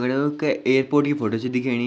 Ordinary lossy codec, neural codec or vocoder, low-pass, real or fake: none; none; none; real